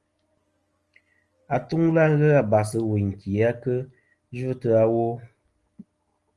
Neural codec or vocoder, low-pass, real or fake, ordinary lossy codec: none; 10.8 kHz; real; Opus, 24 kbps